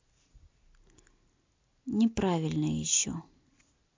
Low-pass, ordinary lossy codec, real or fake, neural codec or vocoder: 7.2 kHz; MP3, 48 kbps; real; none